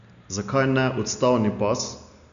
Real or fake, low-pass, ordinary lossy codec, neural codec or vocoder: real; 7.2 kHz; none; none